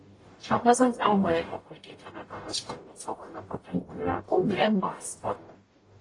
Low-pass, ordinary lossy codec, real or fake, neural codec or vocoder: 10.8 kHz; AAC, 32 kbps; fake; codec, 44.1 kHz, 0.9 kbps, DAC